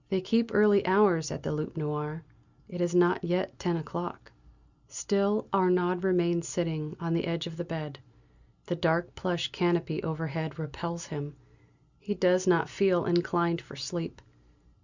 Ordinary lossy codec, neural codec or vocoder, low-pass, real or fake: Opus, 64 kbps; none; 7.2 kHz; real